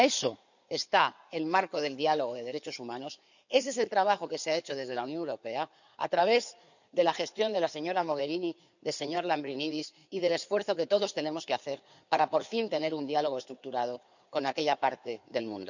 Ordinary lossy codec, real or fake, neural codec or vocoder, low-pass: none; fake; codec, 16 kHz in and 24 kHz out, 2.2 kbps, FireRedTTS-2 codec; 7.2 kHz